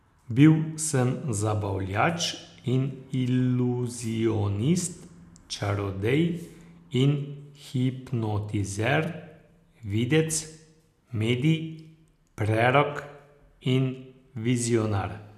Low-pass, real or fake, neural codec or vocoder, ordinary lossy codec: 14.4 kHz; real; none; none